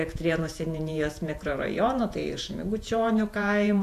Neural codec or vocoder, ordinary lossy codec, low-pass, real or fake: vocoder, 48 kHz, 128 mel bands, Vocos; AAC, 64 kbps; 14.4 kHz; fake